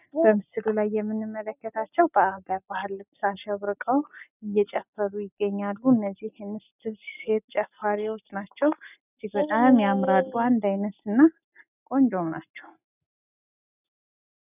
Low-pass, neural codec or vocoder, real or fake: 3.6 kHz; none; real